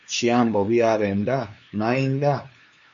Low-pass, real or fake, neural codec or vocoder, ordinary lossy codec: 7.2 kHz; fake; codec, 16 kHz, 2 kbps, FunCodec, trained on LibriTTS, 25 frames a second; MP3, 64 kbps